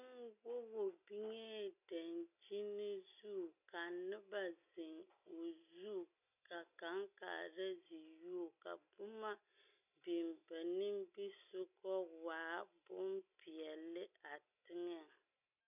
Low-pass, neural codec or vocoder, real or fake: 3.6 kHz; none; real